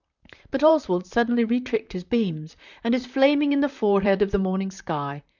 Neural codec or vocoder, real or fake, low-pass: vocoder, 44.1 kHz, 128 mel bands, Pupu-Vocoder; fake; 7.2 kHz